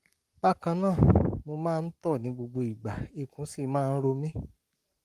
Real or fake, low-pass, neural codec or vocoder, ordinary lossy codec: fake; 14.4 kHz; codec, 44.1 kHz, 7.8 kbps, Pupu-Codec; Opus, 32 kbps